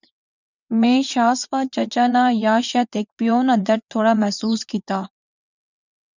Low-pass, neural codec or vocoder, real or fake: 7.2 kHz; vocoder, 22.05 kHz, 80 mel bands, WaveNeXt; fake